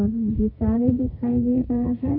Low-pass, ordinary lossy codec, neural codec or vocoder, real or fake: 5.4 kHz; none; codec, 32 kHz, 1.9 kbps, SNAC; fake